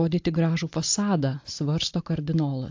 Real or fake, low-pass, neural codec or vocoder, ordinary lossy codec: real; 7.2 kHz; none; AAC, 48 kbps